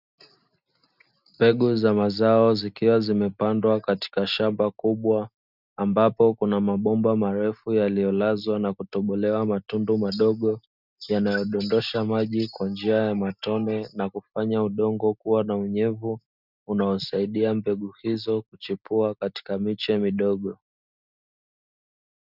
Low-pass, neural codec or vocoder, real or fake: 5.4 kHz; none; real